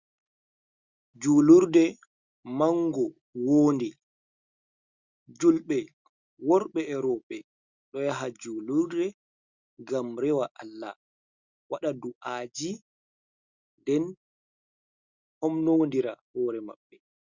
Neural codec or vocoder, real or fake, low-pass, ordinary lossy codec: none; real; 7.2 kHz; Opus, 64 kbps